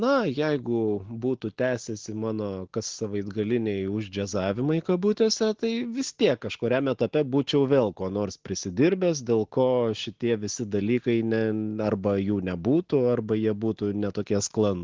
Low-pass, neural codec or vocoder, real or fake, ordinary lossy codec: 7.2 kHz; none; real; Opus, 16 kbps